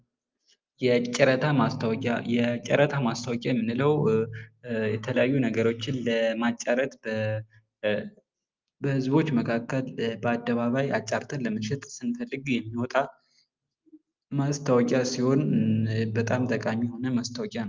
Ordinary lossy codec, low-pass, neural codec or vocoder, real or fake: Opus, 32 kbps; 7.2 kHz; none; real